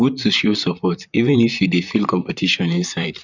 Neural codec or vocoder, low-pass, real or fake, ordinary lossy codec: vocoder, 44.1 kHz, 128 mel bands, Pupu-Vocoder; 7.2 kHz; fake; none